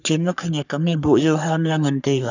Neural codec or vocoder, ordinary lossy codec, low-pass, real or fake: codec, 44.1 kHz, 3.4 kbps, Pupu-Codec; none; 7.2 kHz; fake